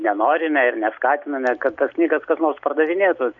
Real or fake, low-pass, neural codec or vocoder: real; 7.2 kHz; none